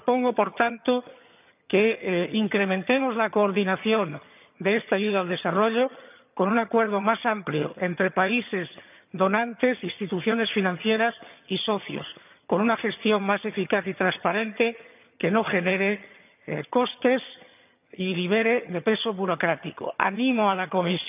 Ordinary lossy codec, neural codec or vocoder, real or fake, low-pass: none; vocoder, 22.05 kHz, 80 mel bands, HiFi-GAN; fake; 3.6 kHz